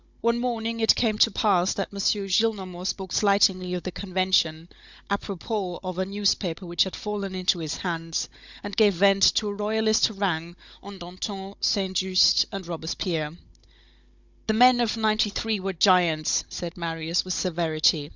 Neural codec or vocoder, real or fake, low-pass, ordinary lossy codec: codec, 16 kHz, 16 kbps, FunCodec, trained on Chinese and English, 50 frames a second; fake; 7.2 kHz; Opus, 64 kbps